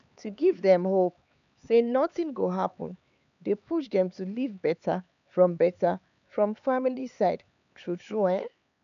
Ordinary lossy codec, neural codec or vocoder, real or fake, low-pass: none; codec, 16 kHz, 4 kbps, X-Codec, HuBERT features, trained on LibriSpeech; fake; 7.2 kHz